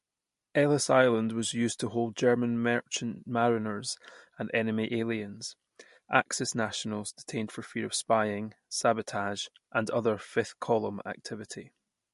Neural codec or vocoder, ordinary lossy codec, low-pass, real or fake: none; MP3, 48 kbps; 14.4 kHz; real